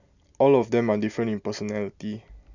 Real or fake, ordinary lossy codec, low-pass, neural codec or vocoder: real; none; 7.2 kHz; none